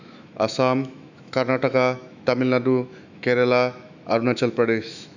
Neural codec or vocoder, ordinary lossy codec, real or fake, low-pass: autoencoder, 48 kHz, 128 numbers a frame, DAC-VAE, trained on Japanese speech; none; fake; 7.2 kHz